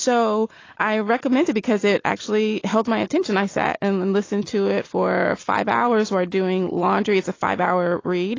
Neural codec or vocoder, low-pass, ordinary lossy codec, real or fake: none; 7.2 kHz; AAC, 32 kbps; real